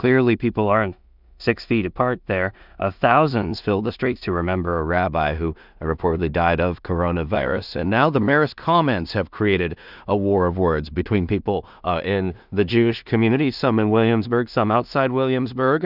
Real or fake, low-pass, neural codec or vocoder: fake; 5.4 kHz; codec, 16 kHz in and 24 kHz out, 0.4 kbps, LongCat-Audio-Codec, two codebook decoder